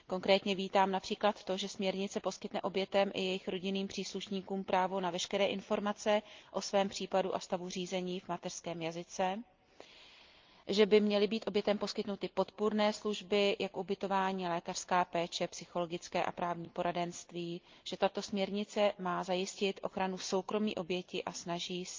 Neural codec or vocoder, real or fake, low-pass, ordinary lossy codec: none; real; 7.2 kHz; Opus, 24 kbps